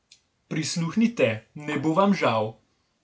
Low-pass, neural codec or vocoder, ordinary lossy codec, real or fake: none; none; none; real